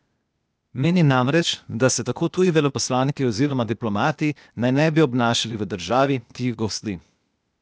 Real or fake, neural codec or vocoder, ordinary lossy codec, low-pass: fake; codec, 16 kHz, 0.8 kbps, ZipCodec; none; none